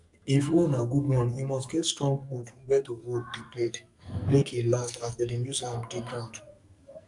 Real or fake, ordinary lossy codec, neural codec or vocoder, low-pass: fake; none; codec, 44.1 kHz, 2.6 kbps, SNAC; 10.8 kHz